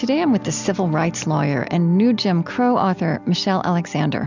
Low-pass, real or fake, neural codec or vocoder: 7.2 kHz; real; none